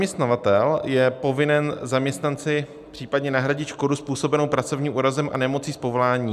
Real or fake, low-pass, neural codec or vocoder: real; 14.4 kHz; none